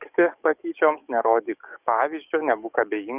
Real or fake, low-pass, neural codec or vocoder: fake; 3.6 kHz; codec, 44.1 kHz, 7.8 kbps, DAC